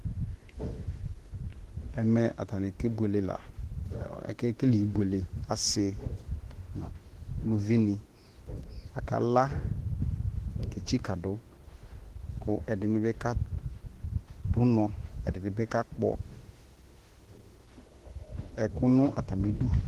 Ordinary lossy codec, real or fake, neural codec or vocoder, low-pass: Opus, 16 kbps; fake; autoencoder, 48 kHz, 32 numbers a frame, DAC-VAE, trained on Japanese speech; 14.4 kHz